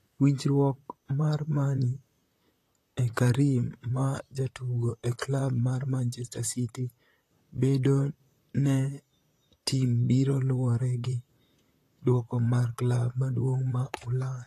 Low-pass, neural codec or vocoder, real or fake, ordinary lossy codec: 14.4 kHz; vocoder, 44.1 kHz, 128 mel bands, Pupu-Vocoder; fake; AAC, 48 kbps